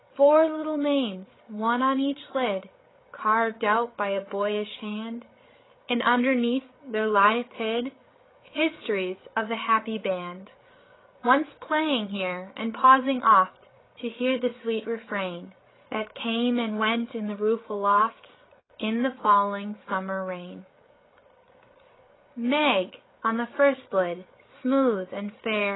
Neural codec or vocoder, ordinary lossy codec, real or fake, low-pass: codec, 16 kHz, 8 kbps, FreqCodec, larger model; AAC, 16 kbps; fake; 7.2 kHz